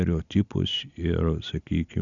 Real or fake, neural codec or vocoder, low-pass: real; none; 7.2 kHz